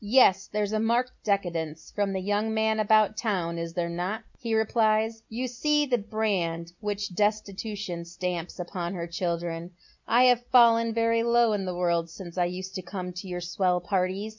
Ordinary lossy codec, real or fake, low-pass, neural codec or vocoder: MP3, 64 kbps; real; 7.2 kHz; none